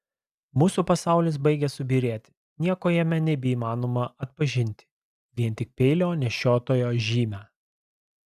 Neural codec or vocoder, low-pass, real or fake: none; 14.4 kHz; real